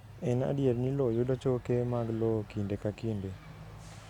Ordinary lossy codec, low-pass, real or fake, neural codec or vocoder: none; 19.8 kHz; real; none